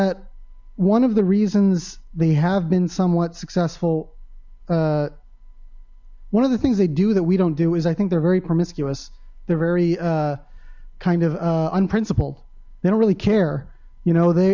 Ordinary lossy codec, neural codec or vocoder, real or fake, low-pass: MP3, 64 kbps; none; real; 7.2 kHz